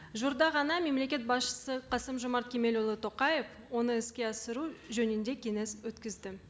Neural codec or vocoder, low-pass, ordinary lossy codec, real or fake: none; none; none; real